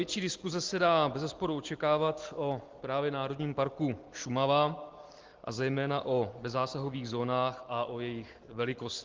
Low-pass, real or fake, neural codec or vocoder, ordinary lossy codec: 7.2 kHz; real; none; Opus, 16 kbps